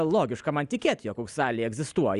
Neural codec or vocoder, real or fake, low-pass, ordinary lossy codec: none; real; 10.8 kHz; Opus, 64 kbps